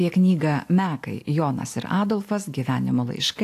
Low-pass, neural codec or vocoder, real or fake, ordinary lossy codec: 14.4 kHz; none; real; AAC, 64 kbps